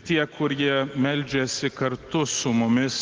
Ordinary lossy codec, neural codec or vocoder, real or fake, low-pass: Opus, 16 kbps; none; real; 7.2 kHz